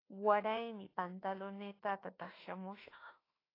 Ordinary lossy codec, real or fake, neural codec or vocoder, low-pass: AAC, 24 kbps; fake; autoencoder, 48 kHz, 32 numbers a frame, DAC-VAE, trained on Japanese speech; 5.4 kHz